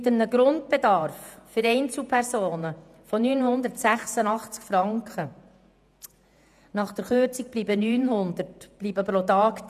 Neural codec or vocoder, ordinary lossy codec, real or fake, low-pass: vocoder, 48 kHz, 128 mel bands, Vocos; none; fake; 14.4 kHz